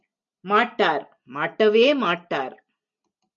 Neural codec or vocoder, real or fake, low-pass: none; real; 7.2 kHz